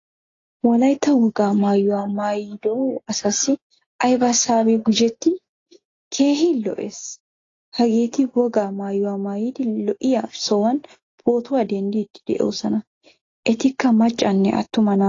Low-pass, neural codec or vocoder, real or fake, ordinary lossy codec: 7.2 kHz; none; real; AAC, 32 kbps